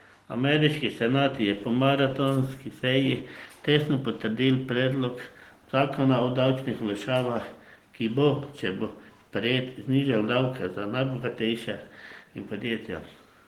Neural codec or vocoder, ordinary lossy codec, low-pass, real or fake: none; Opus, 16 kbps; 19.8 kHz; real